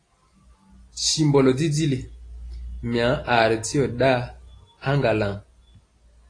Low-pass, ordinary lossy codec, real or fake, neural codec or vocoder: 9.9 kHz; AAC, 32 kbps; real; none